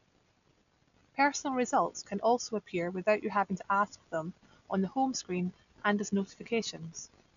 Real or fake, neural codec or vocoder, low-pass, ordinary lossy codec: real; none; 7.2 kHz; none